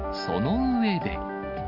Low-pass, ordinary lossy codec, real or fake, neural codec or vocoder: 5.4 kHz; MP3, 48 kbps; real; none